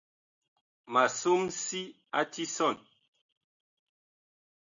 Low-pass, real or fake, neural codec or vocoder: 7.2 kHz; real; none